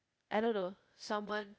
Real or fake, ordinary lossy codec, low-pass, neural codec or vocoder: fake; none; none; codec, 16 kHz, 0.8 kbps, ZipCodec